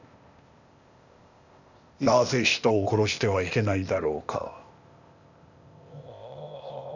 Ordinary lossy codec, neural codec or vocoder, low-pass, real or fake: none; codec, 16 kHz, 0.8 kbps, ZipCodec; 7.2 kHz; fake